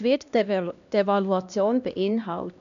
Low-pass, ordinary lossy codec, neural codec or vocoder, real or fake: 7.2 kHz; AAC, 96 kbps; codec, 16 kHz, 1 kbps, X-Codec, HuBERT features, trained on LibriSpeech; fake